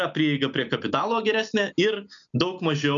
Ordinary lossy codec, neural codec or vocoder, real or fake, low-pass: MP3, 96 kbps; none; real; 7.2 kHz